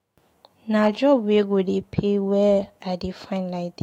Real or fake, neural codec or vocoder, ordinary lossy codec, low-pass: fake; autoencoder, 48 kHz, 128 numbers a frame, DAC-VAE, trained on Japanese speech; AAC, 48 kbps; 19.8 kHz